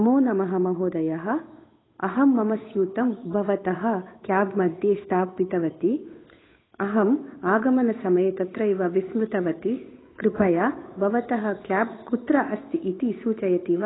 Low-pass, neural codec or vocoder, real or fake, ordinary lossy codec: 7.2 kHz; codec, 16 kHz, 8 kbps, FunCodec, trained on Chinese and English, 25 frames a second; fake; AAC, 16 kbps